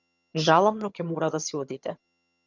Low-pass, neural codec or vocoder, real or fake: 7.2 kHz; vocoder, 22.05 kHz, 80 mel bands, HiFi-GAN; fake